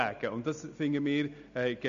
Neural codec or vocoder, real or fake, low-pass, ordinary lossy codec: none; real; 7.2 kHz; MP3, 64 kbps